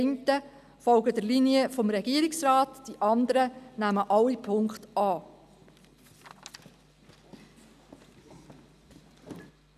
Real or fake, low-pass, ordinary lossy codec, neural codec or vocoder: real; 14.4 kHz; none; none